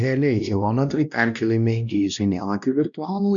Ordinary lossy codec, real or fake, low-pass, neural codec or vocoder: MP3, 96 kbps; fake; 7.2 kHz; codec, 16 kHz, 1 kbps, X-Codec, WavLM features, trained on Multilingual LibriSpeech